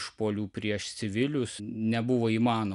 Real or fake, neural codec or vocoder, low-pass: real; none; 10.8 kHz